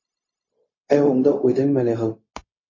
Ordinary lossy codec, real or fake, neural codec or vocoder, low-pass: MP3, 32 kbps; fake; codec, 16 kHz, 0.4 kbps, LongCat-Audio-Codec; 7.2 kHz